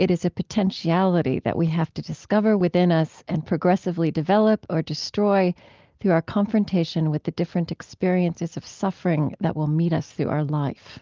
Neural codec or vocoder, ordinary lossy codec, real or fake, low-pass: none; Opus, 32 kbps; real; 7.2 kHz